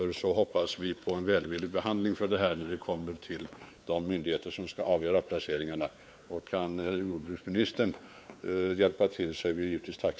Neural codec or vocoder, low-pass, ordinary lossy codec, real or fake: codec, 16 kHz, 4 kbps, X-Codec, WavLM features, trained on Multilingual LibriSpeech; none; none; fake